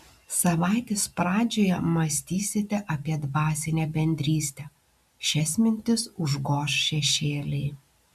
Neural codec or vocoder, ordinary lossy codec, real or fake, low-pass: none; MP3, 96 kbps; real; 14.4 kHz